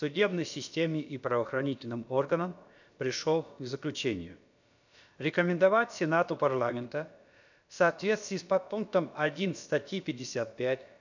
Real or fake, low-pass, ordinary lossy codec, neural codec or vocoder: fake; 7.2 kHz; none; codec, 16 kHz, about 1 kbps, DyCAST, with the encoder's durations